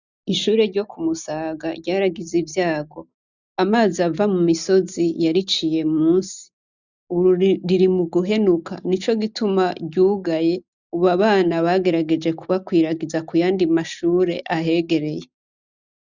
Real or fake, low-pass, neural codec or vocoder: real; 7.2 kHz; none